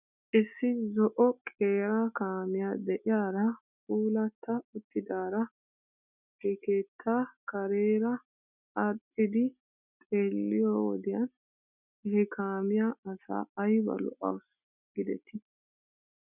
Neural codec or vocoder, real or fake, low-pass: none; real; 3.6 kHz